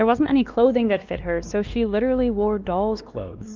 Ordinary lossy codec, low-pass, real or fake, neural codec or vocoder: Opus, 24 kbps; 7.2 kHz; fake; codec, 16 kHz, 2 kbps, X-Codec, WavLM features, trained on Multilingual LibriSpeech